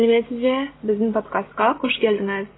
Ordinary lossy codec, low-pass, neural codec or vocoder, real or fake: AAC, 16 kbps; 7.2 kHz; none; real